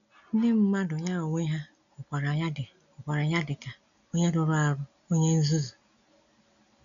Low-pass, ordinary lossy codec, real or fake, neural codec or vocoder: 7.2 kHz; none; real; none